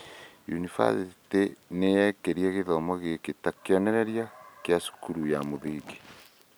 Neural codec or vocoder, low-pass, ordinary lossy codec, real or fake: none; none; none; real